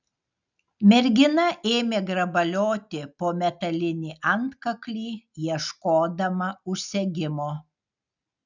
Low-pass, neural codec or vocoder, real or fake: 7.2 kHz; none; real